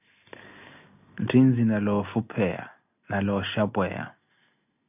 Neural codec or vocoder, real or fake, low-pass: none; real; 3.6 kHz